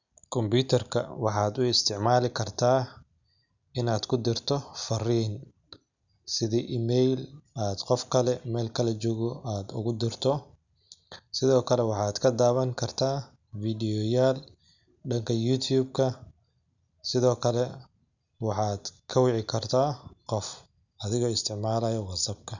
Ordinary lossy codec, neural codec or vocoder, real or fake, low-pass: none; none; real; 7.2 kHz